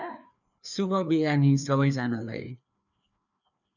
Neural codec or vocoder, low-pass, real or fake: codec, 16 kHz, 2 kbps, FreqCodec, larger model; 7.2 kHz; fake